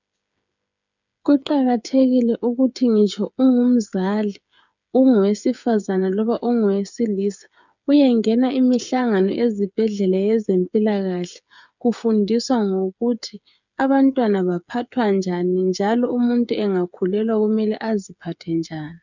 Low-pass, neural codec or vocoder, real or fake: 7.2 kHz; codec, 16 kHz, 8 kbps, FreqCodec, smaller model; fake